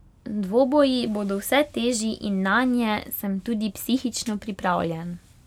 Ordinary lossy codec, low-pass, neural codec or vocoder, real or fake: none; 19.8 kHz; none; real